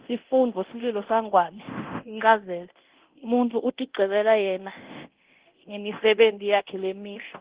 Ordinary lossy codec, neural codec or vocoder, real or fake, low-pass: Opus, 16 kbps; codec, 24 kHz, 0.9 kbps, DualCodec; fake; 3.6 kHz